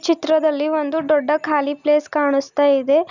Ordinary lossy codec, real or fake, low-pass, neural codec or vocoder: none; real; 7.2 kHz; none